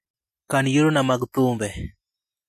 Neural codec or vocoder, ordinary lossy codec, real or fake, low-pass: none; AAC, 64 kbps; real; 14.4 kHz